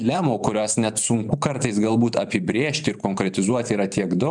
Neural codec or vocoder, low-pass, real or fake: vocoder, 44.1 kHz, 128 mel bands every 512 samples, BigVGAN v2; 10.8 kHz; fake